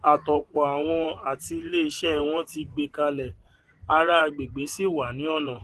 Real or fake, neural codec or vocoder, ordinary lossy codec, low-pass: fake; vocoder, 24 kHz, 100 mel bands, Vocos; Opus, 16 kbps; 10.8 kHz